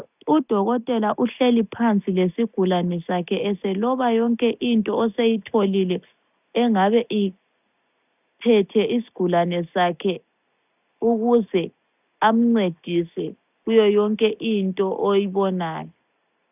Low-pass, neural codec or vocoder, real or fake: 3.6 kHz; none; real